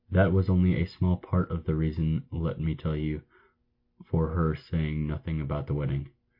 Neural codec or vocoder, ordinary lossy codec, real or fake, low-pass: none; MP3, 32 kbps; real; 5.4 kHz